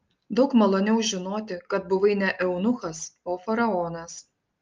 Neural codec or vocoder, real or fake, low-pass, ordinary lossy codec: none; real; 7.2 kHz; Opus, 24 kbps